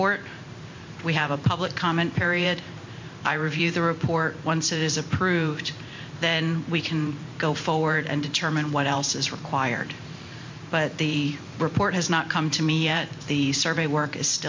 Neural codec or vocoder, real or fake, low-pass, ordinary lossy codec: vocoder, 44.1 kHz, 128 mel bands every 512 samples, BigVGAN v2; fake; 7.2 kHz; MP3, 48 kbps